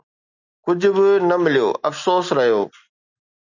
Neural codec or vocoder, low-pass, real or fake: none; 7.2 kHz; real